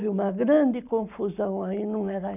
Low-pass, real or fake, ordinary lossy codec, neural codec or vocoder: 3.6 kHz; real; none; none